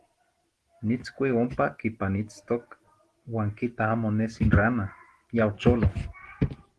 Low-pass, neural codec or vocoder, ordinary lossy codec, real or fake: 10.8 kHz; none; Opus, 16 kbps; real